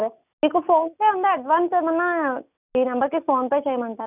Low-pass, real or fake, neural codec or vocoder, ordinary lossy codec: 3.6 kHz; real; none; none